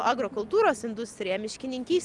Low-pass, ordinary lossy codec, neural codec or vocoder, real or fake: 10.8 kHz; Opus, 32 kbps; none; real